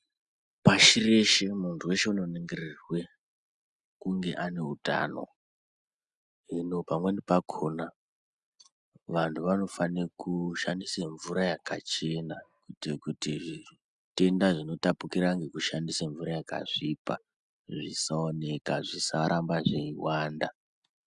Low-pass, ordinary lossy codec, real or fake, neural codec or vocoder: 10.8 kHz; Opus, 64 kbps; real; none